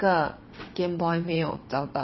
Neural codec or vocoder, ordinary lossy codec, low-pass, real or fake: vocoder, 22.05 kHz, 80 mel bands, WaveNeXt; MP3, 24 kbps; 7.2 kHz; fake